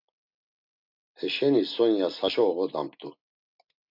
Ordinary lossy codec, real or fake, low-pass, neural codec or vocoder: MP3, 48 kbps; real; 5.4 kHz; none